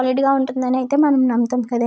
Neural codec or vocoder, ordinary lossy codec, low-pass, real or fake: none; none; none; real